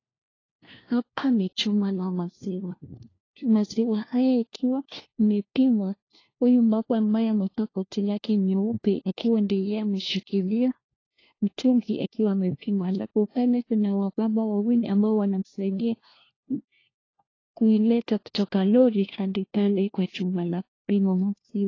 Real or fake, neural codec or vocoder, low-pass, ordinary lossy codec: fake; codec, 16 kHz, 1 kbps, FunCodec, trained on LibriTTS, 50 frames a second; 7.2 kHz; AAC, 32 kbps